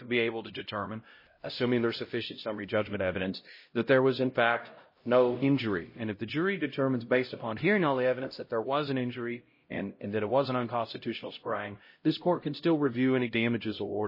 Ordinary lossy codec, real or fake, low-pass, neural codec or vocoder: MP3, 24 kbps; fake; 5.4 kHz; codec, 16 kHz, 0.5 kbps, X-Codec, HuBERT features, trained on LibriSpeech